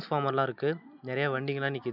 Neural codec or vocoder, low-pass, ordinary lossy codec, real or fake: none; 5.4 kHz; none; real